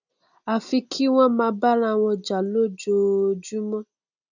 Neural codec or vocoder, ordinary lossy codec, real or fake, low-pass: none; none; real; 7.2 kHz